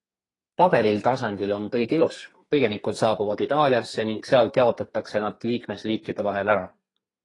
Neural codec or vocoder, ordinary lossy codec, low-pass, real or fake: codec, 32 kHz, 1.9 kbps, SNAC; AAC, 32 kbps; 10.8 kHz; fake